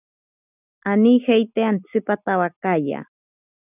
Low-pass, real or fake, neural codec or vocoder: 3.6 kHz; real; none